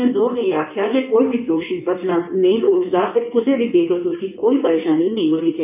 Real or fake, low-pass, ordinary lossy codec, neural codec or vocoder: fake; 3.6 kHz; none; codec, 16 kHz in and 24 kHz out, 1.1 kbps, FireRedTTS-2 codec